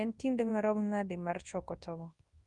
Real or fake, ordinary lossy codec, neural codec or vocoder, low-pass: fake; Opus, 32 kbps; codec, 24 kHz, 0.9 kbps, WavTokenizer, large speech release; 10.8 kHz